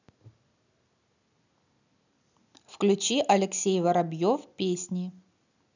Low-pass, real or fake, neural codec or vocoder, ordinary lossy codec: 7.2 kHz; real; none; none